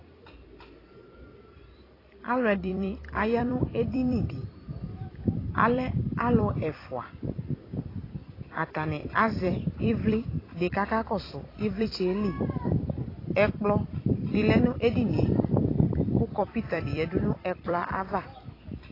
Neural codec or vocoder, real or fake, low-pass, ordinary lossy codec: vocoder, 44.1 kHz, 128 mel bands every 256 samples, BigVGAN v2; fake; 5.4 kHz; AAC, 24 kbps